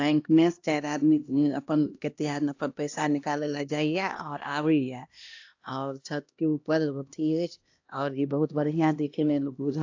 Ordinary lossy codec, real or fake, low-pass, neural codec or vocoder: AAC, 48 kbps; fake; 7.2 kHz; codec, 16 kHz, 1 kbps, X-Codec, HuBERT features, trained on LibriSpeech